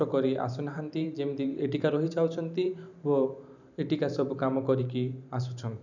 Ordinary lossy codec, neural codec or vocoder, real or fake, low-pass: none; vocoder, 44.1 kHz, 128 mel bands every 512 samples, BigVGAN v2; fake; 7.2 kHz